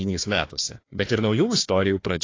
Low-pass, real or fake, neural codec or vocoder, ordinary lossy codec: 7.2 kHz; fake; codec, 16 kHz, 1 kbps, FunCodec, trained on Chinese and English, 50 frames a second; AAC, 32 kbps